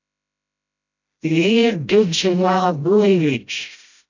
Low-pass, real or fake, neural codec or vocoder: 7.2 kHz; fake; codec, 16 kHz, 0.5 kbps, FreqCodec, smaller model